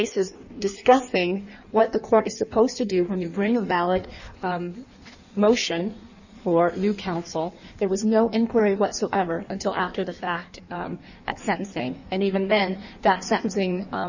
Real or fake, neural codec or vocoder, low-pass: fake; codec, 16 kHz in and 24 kHz out, 1.1 kbps, FireRedTTS-2 codec; 7.2 kHz